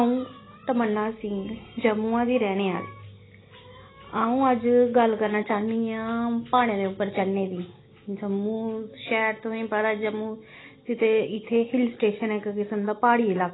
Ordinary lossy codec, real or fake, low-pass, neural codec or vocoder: AAC, 16 kbps; real; 7.2 kHz; none